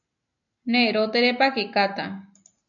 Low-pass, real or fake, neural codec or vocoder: 7.2 kHz; real; none